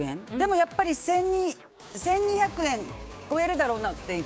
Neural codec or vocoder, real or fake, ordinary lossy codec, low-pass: codec, 16 kHz, 6 kbps, DAC; fake; none; none